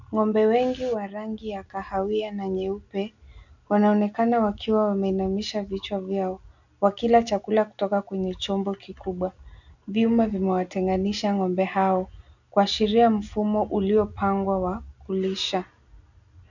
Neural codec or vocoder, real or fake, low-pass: none; real; 7.2 kHz